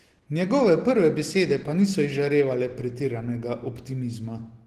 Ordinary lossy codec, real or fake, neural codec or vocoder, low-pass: Opus, 16 kbps; real; none; 14.4 kHz